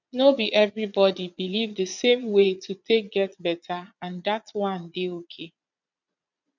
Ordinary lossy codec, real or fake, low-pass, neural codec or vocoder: none; fake; 7.2 kHz; vocoder, 22.05 kHz, 80 mel bands, Vocos